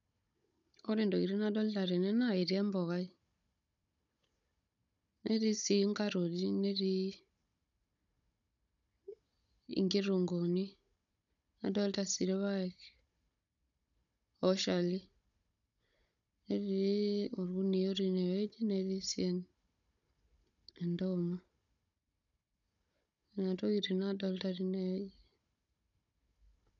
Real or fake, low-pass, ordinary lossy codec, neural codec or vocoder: fake; 7.2 kHz; none; codec, 16 kHz, 16 kbps, FunCodec, trained on Chinese and English, 50 frames a second